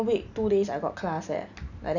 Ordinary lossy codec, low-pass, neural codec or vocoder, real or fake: none; 7.2 kHz; none; real